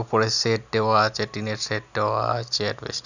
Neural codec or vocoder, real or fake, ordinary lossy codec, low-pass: none; real; none; 7.2 kHz